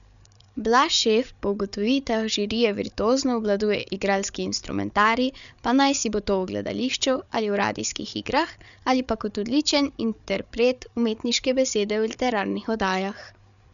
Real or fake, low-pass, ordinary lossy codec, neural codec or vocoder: fake; 7.2 kHz; none; codec, 16 kHz, 8 kbps, FreqCodec, larger model